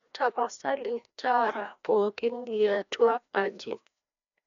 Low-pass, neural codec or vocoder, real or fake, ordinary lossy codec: 7.2 kHz; codec, 16 kHz, 1 kbps, FreqCodec, larger model; fake; none